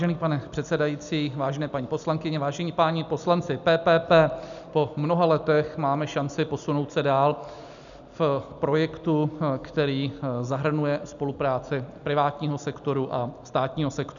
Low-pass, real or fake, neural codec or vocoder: 7.2 kHz; real; none